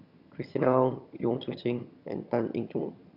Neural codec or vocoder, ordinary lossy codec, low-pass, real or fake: vocoder, 22.05 kHz, 80 mel bands, HiFi-GAN; Opus, 64 kbps; 5.4 kHz; fake